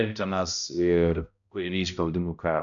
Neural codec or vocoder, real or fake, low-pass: codec, 16 kHz, 0.5 kbps, X-Codec, HuBERT features, trained on balanced general audio; fake; 7.2 kHz